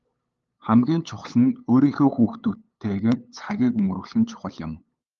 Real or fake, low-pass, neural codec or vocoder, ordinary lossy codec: fake; 7.2 kHz; codec, 16 kHz, 8 kbps, FunCodec, trained on LibriTTS, 25 frames a second; Opus, 32 kbps